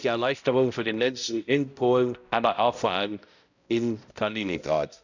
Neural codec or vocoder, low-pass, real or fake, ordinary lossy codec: codec, 16 kHz, 0.5 kbps, X-Codec, HuBERT features, trained on balanced general audio; 7.2 kHz; fake; none